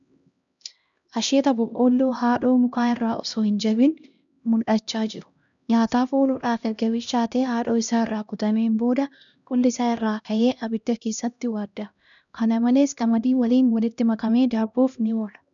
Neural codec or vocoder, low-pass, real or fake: codec, 16 kHz, 1 kbps, X-Codec, HuBERT features, trained on LibriSpeech; 7.2 kHz; fake